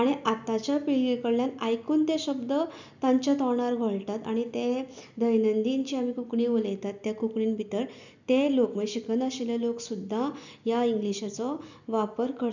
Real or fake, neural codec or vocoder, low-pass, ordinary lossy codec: real; none; 7.2 kHz; none